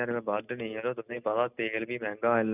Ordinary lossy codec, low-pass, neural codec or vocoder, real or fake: none; 3.6 kHz; none; real